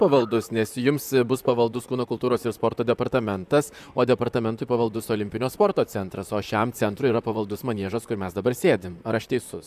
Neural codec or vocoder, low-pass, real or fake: vocoder, 44.1 kHz, 128 mel bands, Pupu-Vocoder; 14.4 kHz; fake